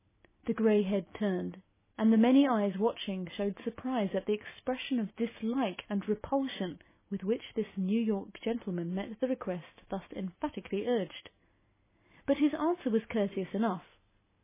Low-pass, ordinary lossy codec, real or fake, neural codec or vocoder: 3.6 kHz; MP3, 16 kbps; real; none